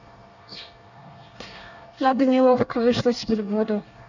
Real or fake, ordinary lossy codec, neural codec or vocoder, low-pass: fake; none; codec, 24 kHz, 1 kbps, SNAC; 7.2 kHz